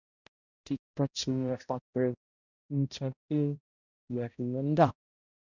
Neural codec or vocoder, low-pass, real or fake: codec, 16 kHz, 0.5 kbps, X-Codec, HuBERT features, trained on balanced general audio; 7.2 kHz; fake